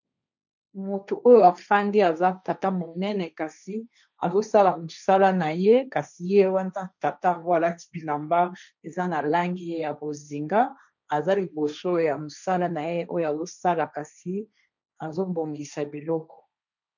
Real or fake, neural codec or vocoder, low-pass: fake; codec, 16 kHz, 1.1 kbps, Voila-Tokenizer; 7.2 kHz